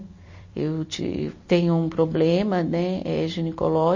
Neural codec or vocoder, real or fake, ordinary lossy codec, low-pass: vocoder, 44.1 kHz, 128 mel bands every 256 samples, BigVGAN v2; fake; MP3, 32 kbps; 7.2 kHz